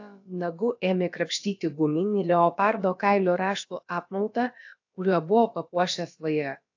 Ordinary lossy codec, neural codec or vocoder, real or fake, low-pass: AAC, 48 kbps; codec, 16 kHz, about 1 kbps, DyCAST, with the encoder's durations; fake; 7.2 kHz